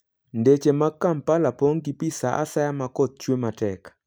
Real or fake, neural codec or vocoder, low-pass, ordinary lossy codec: real; none; none; none